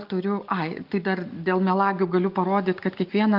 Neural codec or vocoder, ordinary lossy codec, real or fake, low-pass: none; Opus, 32 kbps; real; 5.4 kHz